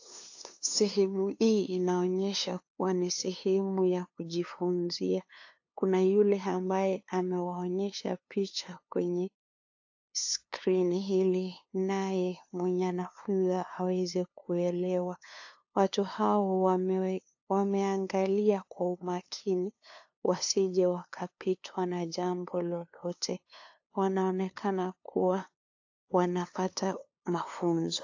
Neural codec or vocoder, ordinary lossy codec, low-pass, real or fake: codec, 16 kHz, 2 kbps, FunCodec, trained on LibriTTS, 25 frames a second; AAC, 48 kbps; 7.2 kHz; fake